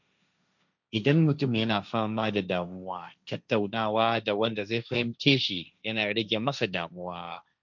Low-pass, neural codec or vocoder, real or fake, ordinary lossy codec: 7.2 kHz; codec, 16 kHz, 1.1 kbps, Voila-Tokenizer; fake; none